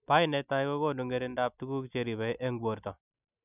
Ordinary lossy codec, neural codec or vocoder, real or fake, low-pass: none; none; real; 3.6 kHz